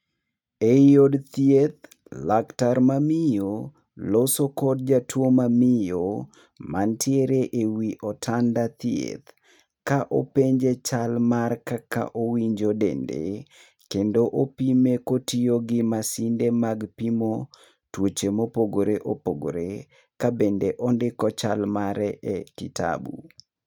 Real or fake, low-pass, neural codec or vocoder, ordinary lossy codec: real; 19.8 kHz; none; none